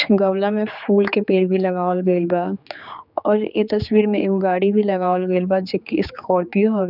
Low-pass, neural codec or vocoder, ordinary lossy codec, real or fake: 5.4 kHz; codec, 16 kHz, 4 kbps, X-Codec, HuBERT features, trained on general audio; none; fake